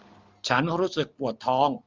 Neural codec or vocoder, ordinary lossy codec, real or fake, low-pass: none; Opus, 32 kbps; real; 7.2 kHz